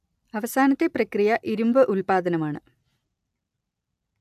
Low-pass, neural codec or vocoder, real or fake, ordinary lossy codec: 14.4 kHz; none; real; AAC, 96 kbps